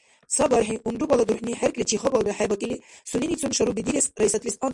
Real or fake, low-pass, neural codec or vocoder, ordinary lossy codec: real; 10.8 kHz; none; MP3, 64 kbps